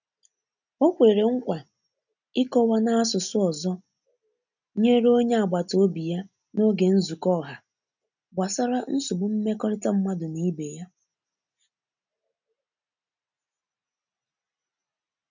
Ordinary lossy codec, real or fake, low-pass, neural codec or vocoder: none; real; 7.2 kHz; none